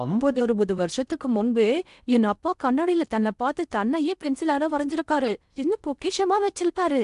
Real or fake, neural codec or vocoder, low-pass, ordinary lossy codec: fake; codec, 16 kHz in and 24 kHz out, 0.6 kbps, FocalCodec, streaming, 4096 codes; 10.8 kHz; none